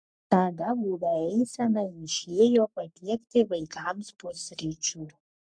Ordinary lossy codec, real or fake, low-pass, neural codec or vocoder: MP3, 64 kbps; fake; 9.9 kHz; codec, 44.1 kHz, 3.4 kbps, Pupu-Codec